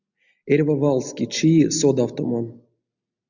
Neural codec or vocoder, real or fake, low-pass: none; real; 7.2 kHz